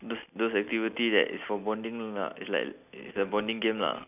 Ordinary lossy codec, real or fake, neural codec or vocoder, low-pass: none; real; none; 3.6 kHz